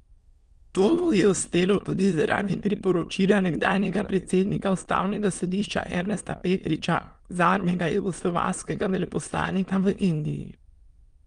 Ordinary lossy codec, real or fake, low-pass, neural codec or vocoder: Opus, 32 kbps; fake; 9.9 kHz; autoencoder, 22.05 kHz, a latent of 192 numbers a frame, VITS, trained on many speakers